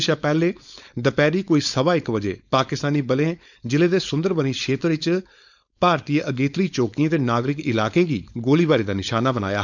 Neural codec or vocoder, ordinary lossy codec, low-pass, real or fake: codec, 16 kHz, 4.8 kbps, FACodec; none; 7.2 kHz; fake